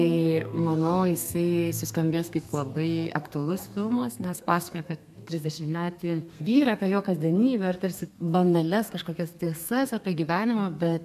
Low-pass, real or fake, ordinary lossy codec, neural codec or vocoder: 14.4 kHz; fake; MP3, 96 kbps; codec, 32 kHz, 1.9 kbps, SNAC